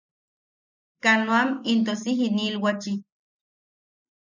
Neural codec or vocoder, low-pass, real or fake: none; 7.2 kHz; real